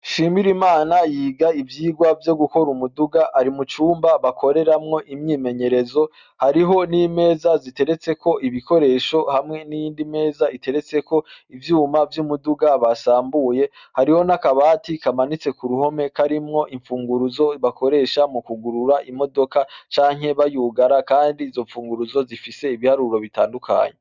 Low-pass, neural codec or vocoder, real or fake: 7.2 kHz; none; real